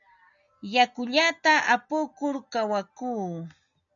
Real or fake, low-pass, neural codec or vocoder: real; 7.2 kHz; none